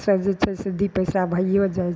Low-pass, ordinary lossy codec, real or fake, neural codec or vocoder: none; none; real; none